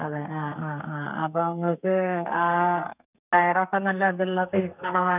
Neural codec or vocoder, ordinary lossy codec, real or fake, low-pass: codec, 32 kHz, 1.9 kbps, SNAC; none; fake; 3.6 kHz